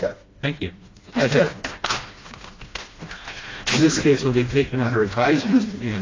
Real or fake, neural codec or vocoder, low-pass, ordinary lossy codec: fake; codec, 16 kHz, 1 kbps, FreqCodec, smaller model; 7.2 kHz; AAC, 32 kbps